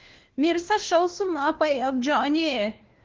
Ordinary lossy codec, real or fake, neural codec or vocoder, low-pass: Opus, 16 kbps; fake; codec, 16 kHz, 1 kbps, FunCodec, trained on LibriTTS, 50 frames a second; 7.2 kHz